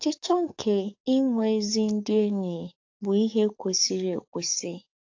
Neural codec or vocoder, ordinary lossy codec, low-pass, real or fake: codec, 44.1 kHz, 7.8 kbps, DAC; AAC, 48 kbps; 7.2 kHz; fake